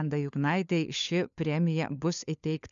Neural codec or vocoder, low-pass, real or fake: codec, 16 kHz, 4 kbps, FunCodec, trained on LibriTTS, 50 frames a second; 7.2 kHz; fake